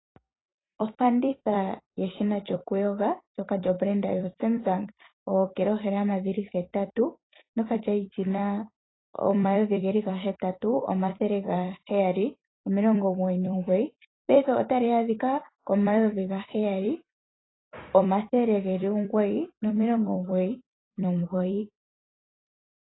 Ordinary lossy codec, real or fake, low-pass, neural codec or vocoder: AAC, 16 kbps; fake; 7.2 kHz; vocoder, 44.1 kHz, 128 mel bands every 256 samples, BigVGAN v2